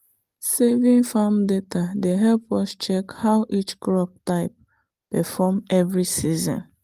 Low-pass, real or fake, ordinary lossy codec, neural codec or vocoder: 14.4 kHz; real; Opus, 24 kbps; none